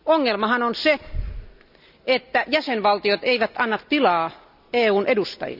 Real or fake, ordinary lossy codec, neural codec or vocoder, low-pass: real; none; none; 5.4 kHz